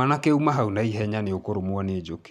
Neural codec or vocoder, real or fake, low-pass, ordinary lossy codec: none; real; 14.4 kHz; none